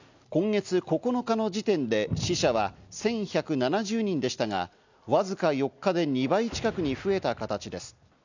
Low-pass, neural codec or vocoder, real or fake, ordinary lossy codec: 7.2 kHz; none; real; none